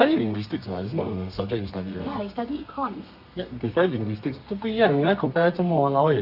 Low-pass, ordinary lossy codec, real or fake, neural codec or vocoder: 5.4 kHz; Opus, 64 kbps; fake; codec, 32 kHz, 1.9 kbps, SNAC